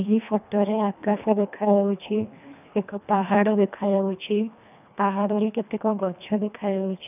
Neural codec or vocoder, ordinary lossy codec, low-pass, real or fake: codec, 24 kHz, 1.5 kbps, HILCodec; none; 3.6 kHz; fake